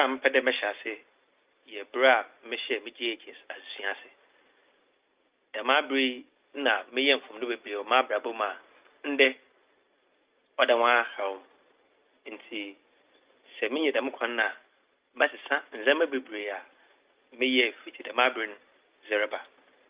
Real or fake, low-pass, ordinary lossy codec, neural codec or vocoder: real; 3.6 kHz; Opus, 32 kbps; none